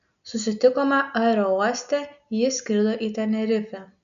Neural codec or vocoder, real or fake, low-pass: none; real; 7.2 kHz